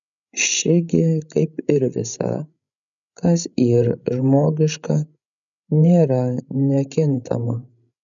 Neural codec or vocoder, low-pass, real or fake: codec, 16 kHz, 16 kbps, FreqCodec, larger model; 7.2 kHz; fake